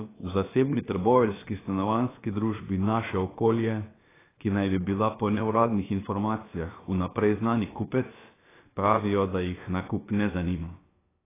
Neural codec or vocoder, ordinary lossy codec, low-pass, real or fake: codec, 16 kHz, about 1 kbps, DyCAST, with the encoder's durations; AAC, 16 kbps; 3.6 kHz; fake